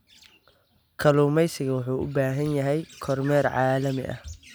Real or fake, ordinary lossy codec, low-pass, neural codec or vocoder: real; none; none; none